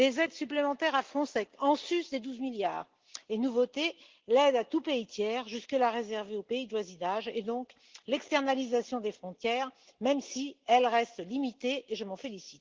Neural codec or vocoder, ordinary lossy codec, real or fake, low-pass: none; Opus, 16 kbps; real; 7.2 kHz